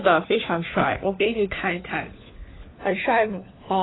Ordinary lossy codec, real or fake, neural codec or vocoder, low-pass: AAC, 16 kbps; fake; codec, 16 kHz, 4 kbps, FunCodec, trained on LibriTTS, 50 frames a second; 7.2 kHz